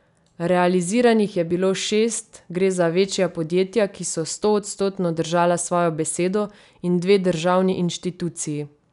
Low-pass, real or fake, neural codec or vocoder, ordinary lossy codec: 10.8 kHz; real; none; none